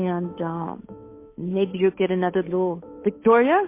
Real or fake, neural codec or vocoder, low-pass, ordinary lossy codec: fake; codec, 16 kHz, 8 kbps, FunCodec, trained on Chinese and English, 25 frames a second; 3.6 kHz; MP3, 24 kbps